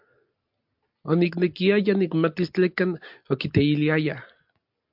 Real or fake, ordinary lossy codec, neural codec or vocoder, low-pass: real; MP3, 48 kbps; none; 5.4 kHz